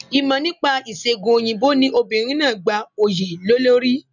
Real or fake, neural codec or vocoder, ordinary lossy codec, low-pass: real; none; none; 7.2 kHz